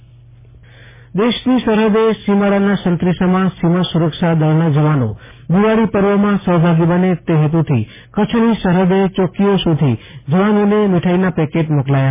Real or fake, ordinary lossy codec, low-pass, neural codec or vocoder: real; MP3, 16 kbps; 3.6 kHz; none